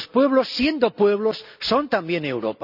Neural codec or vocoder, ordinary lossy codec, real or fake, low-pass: none; none; real; 5.4 kHz